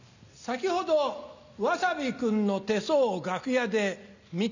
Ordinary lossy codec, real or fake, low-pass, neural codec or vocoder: none; real; 7.2 kHz; none